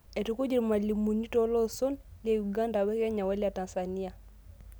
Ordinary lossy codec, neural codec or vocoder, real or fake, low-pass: none; none; real; none